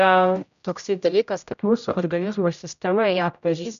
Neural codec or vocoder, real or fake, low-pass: codec, 16 kHz, 0.5 kbps, X-Codec, HuBERT features, trained on general audio; fake; 7.2 kHz